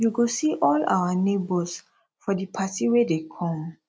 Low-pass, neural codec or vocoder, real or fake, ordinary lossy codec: none; none; real; none